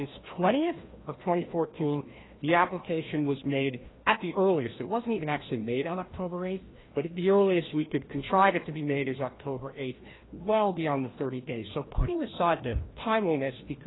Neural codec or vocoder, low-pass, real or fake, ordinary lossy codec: codec, 16 kHz, 1 kbps, FreqCodec, larger model; 7.2 kHz; fake; AAC, 16 kbps